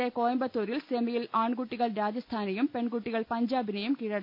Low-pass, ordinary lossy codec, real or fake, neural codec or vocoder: 5.4 kHz; MP3, 48 kbps; real; none